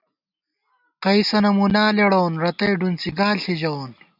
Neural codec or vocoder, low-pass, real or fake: none; 5.4 kHz; real